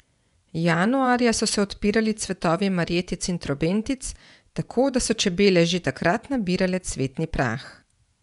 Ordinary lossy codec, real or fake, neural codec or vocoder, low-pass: none; fake; vocoder, 24 kHz, 100 mel bands, Vocos; 10.8 kHz